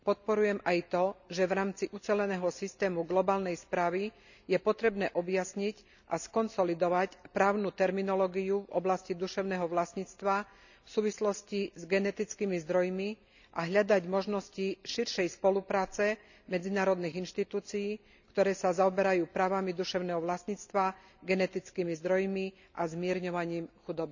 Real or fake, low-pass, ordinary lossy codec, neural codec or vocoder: real; 7.2 kHz; none; none